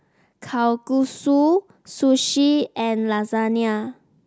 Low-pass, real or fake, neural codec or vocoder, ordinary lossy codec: none; real; none; none